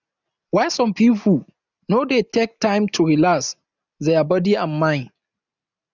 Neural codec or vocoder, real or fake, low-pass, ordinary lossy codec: none; real; 7.2 kHz; none